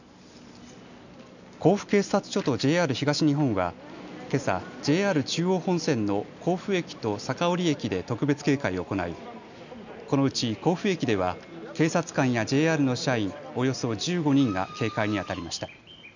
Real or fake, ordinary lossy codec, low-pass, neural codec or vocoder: real; none; 7.2 kHz; none